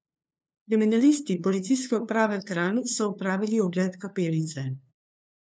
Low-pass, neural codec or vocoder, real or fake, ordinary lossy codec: none; codec, 16 kHz, 2 kbps, FunCodec, trained on LibriTTS, 25 frames a second; fake; none